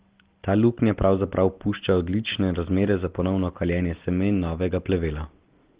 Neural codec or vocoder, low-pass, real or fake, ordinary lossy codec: none; 3.6 kHz; real; Opus, 16 kbps